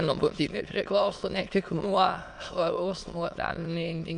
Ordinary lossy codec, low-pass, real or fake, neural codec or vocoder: MP3, 64 kbps; 9.9 kHz; fake; autoencoder, 22.05 kHz, a latent of 192 numbers a frame, VITS, trained on many speakers